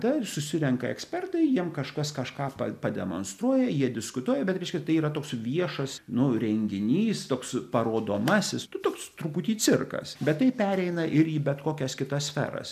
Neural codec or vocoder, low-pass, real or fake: none; 14.4 kHz; real